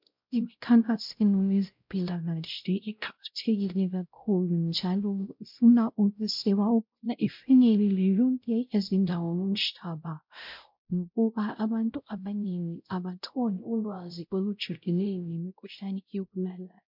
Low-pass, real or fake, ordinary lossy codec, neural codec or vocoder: 5.4 kHz; fake; MP3, 48 kbps; codec, 16 kHz, 0.5 kbps, X-Codec, WavLM features, trained on Multilingual LibriSpeech